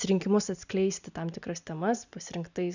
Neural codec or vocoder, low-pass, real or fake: none; 7.2 kHz; real